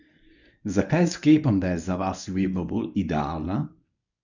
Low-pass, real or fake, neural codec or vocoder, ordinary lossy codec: 7.2 kHz; fake; codec, 24 kHz, 0.9 kbps, WavTokenizer, medium speech release version 1; none